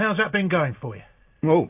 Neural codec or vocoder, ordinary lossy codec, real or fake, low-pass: none; AAC, 32 kbps; real; 3.6 kHz